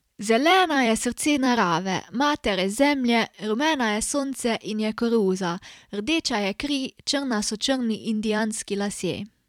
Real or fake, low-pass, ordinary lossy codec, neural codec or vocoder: fake; 19.8 kHz; none; vocoder, 44.1 kHz, 128 mel bands every 256 samples, BigVGAN v2